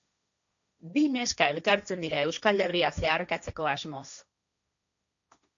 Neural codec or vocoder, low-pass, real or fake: codec, 16 kHz, 1.1 kbps, Voila-Tokenizer; 7.2 kHz; fake